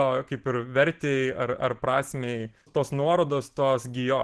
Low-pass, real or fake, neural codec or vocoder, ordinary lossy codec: 10.8 kHz; fake; vocoder, 44.1 kHz, 128 mel bands every 512 samples, BigVGAN v2; Opus, 16 kbps